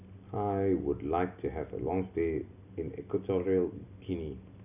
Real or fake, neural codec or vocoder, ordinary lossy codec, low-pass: real; none; none; 3.6 kHz